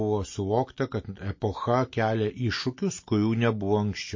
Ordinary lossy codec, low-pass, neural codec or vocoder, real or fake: MP3, 32 kbps; 7.2 kHz; none; real